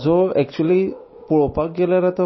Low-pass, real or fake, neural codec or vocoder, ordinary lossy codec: 7.2 kHz; real; none; MP3, 24 kbps